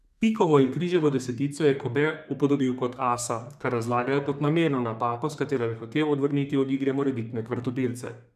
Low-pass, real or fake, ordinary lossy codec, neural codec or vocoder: 14.4 kHz; fake; none; codec, 32 kHz, 1.9 kbps, SNAC